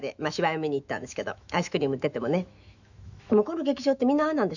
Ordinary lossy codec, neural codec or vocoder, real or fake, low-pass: none; autoencoder, 48 kHz, 128 numbers a frame, DAC-VAE, trained on Japanese speech; fake; 7.2 kHz